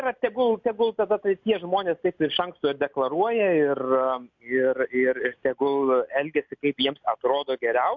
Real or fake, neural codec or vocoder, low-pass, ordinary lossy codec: real; none; 7.2 kHz; MP3, 64 kbps